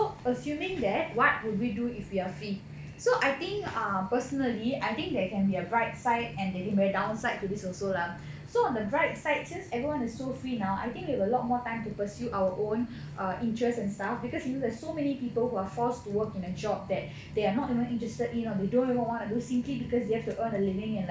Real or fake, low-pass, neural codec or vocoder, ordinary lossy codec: real; none; none; none